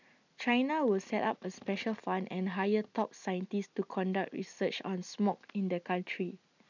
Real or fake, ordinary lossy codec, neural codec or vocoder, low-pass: real; none; none; 7.2 kHz